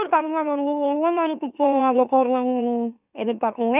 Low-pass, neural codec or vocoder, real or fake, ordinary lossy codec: 3.6 kHz; autoencoder, 44.1 kHz, a latent of 192 numbers a frame, MeloTTS; fake; none